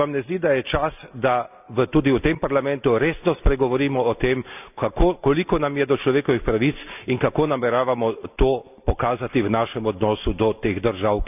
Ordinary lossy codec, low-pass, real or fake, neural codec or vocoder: none; 3.6 kHz; real; none